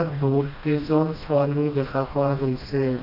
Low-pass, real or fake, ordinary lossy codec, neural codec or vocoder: 5.4 kHz; fake; none; codec, 16 kHz, 1 kbps, FreqCodec, smaller model